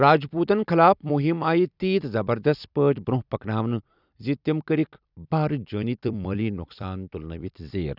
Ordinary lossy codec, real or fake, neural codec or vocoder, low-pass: none; fake; vocoder, 44.1 kHz, 128 mel bands every 256 samples, BigVGAN v2; 5.4 kHz